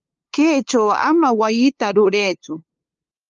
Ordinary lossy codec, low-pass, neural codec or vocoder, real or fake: Opus, 24 kbps; 7.2 kHz; codec, 16 kHz, 2 kbps, FunCodec, trained on LibriTTS, 25 frames a second; fake